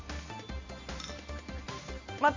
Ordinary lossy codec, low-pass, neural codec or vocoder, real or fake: MP3, 64 kbps; 7.2 kHz; none; real